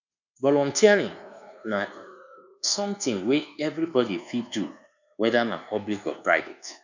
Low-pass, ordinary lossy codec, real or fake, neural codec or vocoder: 7.2 kHz; none; fake; codec, 24 kHz, 1.2 kbps, DualCodec